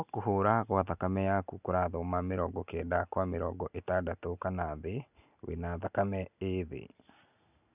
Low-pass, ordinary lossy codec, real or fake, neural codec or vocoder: 3.6 kHz; none; real; none